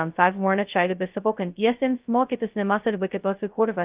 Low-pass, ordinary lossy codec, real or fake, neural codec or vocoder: 3.6 kHz; Opus, 24 kbps; fake; codec, 16 kHz, 0.2 kbps, FocalCodec